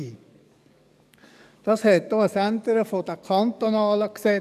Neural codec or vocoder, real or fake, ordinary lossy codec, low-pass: codec, 44.1 kHz, 7.8 kbps, DAC; fake; none; 14.4 kHz